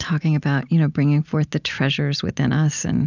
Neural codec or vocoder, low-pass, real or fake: none; 7.2 kHz; real